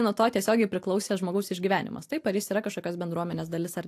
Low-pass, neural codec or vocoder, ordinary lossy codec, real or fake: 14.4 kHz; none; AAC, 64 kbps; real